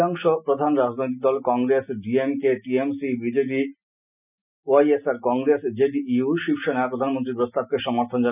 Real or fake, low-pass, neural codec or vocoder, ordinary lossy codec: real; 3.6 kHz; none; none